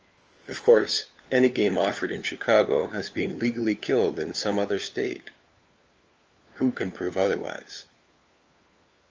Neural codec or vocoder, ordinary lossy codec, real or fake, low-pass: codec, 16 kHz, 4 kbps, FunCodec, trained on LibriTTS, 50 frames a second; Opus, 24 kbps; fake; 7.2 kHz